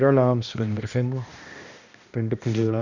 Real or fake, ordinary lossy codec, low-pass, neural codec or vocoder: fake; none; 7.2 kHz; codec, 16 kHz, 1 kbps, X-Codec, HuBERT features, trained on balanced general audio